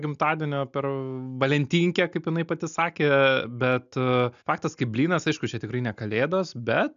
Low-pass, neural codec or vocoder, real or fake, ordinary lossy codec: 7.2 kHz; none; real; AAC, 96 kbps